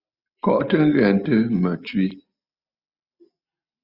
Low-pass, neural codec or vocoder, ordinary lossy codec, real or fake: 5.4 kHz; none; Opus, 64 kbps; real